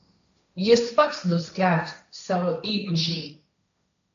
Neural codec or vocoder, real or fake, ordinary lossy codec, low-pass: codec, 16 kHz, 1.1 kbps, Voila-Tokenizer; fake; AAC, 64 kbps; 7.2 kHz